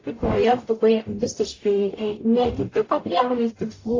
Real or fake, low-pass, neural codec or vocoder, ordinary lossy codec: fake; 7.2 kHz; codec, 44.1 kHz, 0.9 kbps, DAC; AAC, 32 kbps